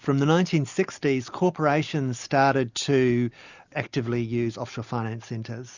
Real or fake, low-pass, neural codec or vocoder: real; 7.2 kHz; none